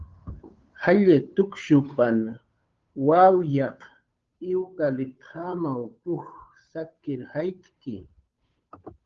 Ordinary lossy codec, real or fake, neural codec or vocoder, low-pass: Opus, 32 kbps; fake; codec, 16 kHz, 2 kbps, FunCodec, trained on Chinese and English, 25 frames a second; 7.2 kHz